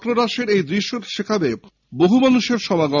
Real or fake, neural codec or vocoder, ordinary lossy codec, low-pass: real; none; none; 7.2 kHz